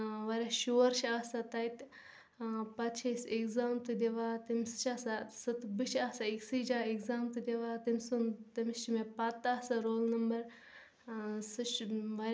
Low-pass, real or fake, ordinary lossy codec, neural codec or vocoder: none; real; none; none